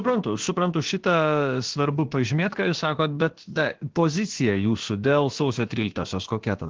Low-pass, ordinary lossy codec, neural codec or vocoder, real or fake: 7.2 kHz; Opus, 16 kbps; codec, 16 kHz, about 1 kbps, DyCAST, with the encoder's durations; fake